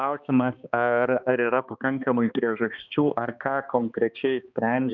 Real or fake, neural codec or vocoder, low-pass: fake; codec, 16 kHz, 2 kbps, X-Codec, HuBERT features, trained on balanced general audio; 7.2 kHz